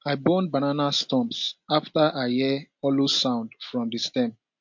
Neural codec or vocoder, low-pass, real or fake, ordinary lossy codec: none; 7.2 kHz; real; MP3, 48 kbps